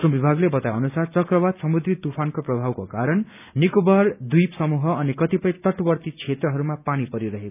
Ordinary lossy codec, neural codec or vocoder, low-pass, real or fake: none; none; 3.6 kHz; real